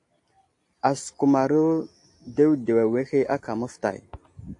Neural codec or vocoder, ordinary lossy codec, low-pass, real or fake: none; AAC, 48 kbps; 10.8 kHz; real